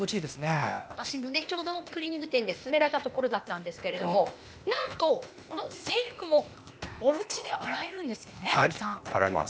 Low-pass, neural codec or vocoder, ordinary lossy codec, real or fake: none; codec, 16 kHz, 0.8 kbps, ZipCodec; none; fake